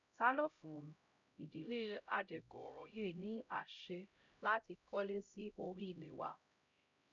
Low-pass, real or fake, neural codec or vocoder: 7.2 kHz; fake; codec, 16 kHz, 0.5 kbps, X-Codec, HuBERT features, trained on LibriSpeech